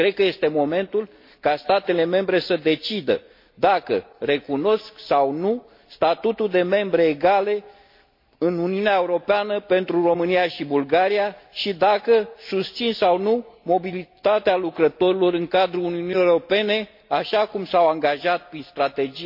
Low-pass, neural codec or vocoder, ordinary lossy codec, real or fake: 5.4 kHz; none; MP3, 32 kbps; real